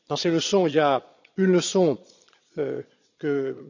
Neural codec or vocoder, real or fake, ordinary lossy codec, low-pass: vocoder, 22.05 kHz, 80 mel bands, Vocos; fake; none; 7.2 kHz